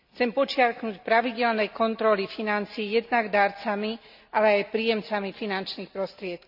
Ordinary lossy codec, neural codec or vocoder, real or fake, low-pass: none; none; real; 5.4 kHz